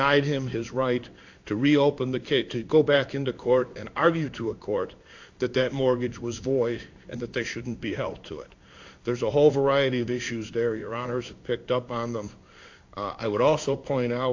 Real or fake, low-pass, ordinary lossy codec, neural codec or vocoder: fake; 7.2 kHz; AAC, 48 kbps; codec, 16 kHz in and 24 kHz out, 1 kbps, XY-Tokenizer